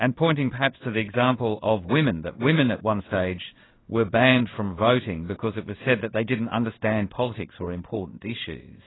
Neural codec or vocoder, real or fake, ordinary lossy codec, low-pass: codec, 16 kHz in and 24 kHz out, 1 kbps, XY-Tokenizer; fake; AAC, 16 kbps; 7.2 kHz